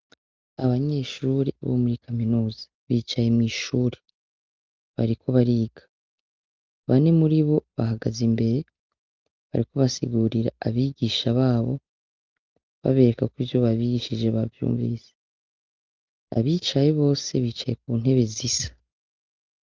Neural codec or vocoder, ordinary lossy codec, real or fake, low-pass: none; Opus, 32 kbps; real; 7.2 kHz